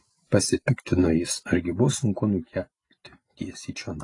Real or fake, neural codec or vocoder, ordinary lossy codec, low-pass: real; none; AAC, 32 kbps; 10.8 kHz